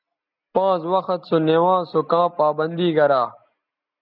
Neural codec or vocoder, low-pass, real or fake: none; 5.4 kHz; real